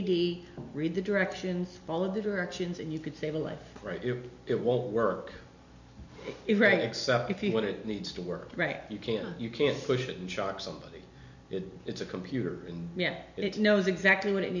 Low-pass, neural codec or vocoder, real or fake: 7.2 kHz; none; real